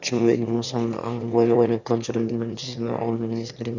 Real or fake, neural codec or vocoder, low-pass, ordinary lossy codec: fake; autoencoder, 22.05 kHz, a latent of 192 numbers a frame, VITS, trained on one speaker; 7.2 kHz; none